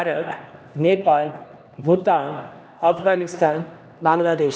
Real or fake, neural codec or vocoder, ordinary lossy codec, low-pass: fake; codec, 16 kHz, 1 kbps, X-Codec, HuBERT features, trained on LibriSpeech; none; none